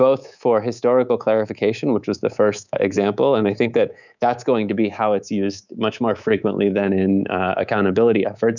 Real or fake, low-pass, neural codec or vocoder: fake; 7.2 kHz; codec, 24 kHz, 3.1 kbps, DualCodec